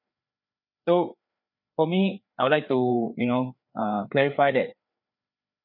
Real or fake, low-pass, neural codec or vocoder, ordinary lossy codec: fake; 5.4 kHz; codec, 16 kHz, 4 kbps, FreqCodec, larger model; none